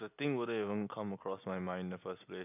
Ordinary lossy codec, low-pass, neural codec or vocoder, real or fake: none; 3.6 kHz; none; real